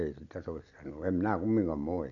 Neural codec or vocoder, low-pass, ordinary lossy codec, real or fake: none; 7.2 kHz; none; real